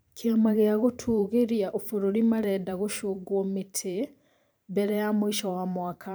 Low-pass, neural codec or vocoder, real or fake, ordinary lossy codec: none; vocoder, 44.1 kHz, 128 mel bands every 512 samples, BigVGAN v2; fake; none